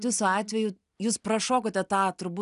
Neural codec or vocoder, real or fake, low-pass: none; real; 10.8 kHz